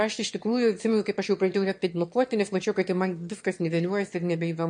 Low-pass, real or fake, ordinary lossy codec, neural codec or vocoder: 9.9 kHz; fake; MP3, 48 kbps; autoencoder, 22.05 kHz, a latent of 192 numbers a frame, VITS, trained on one speaker